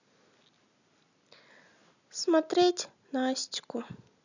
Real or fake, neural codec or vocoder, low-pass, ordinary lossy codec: real; none; 7.2 kHz; none